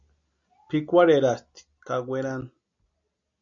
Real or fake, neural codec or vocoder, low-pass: real; none; 7.2 kHz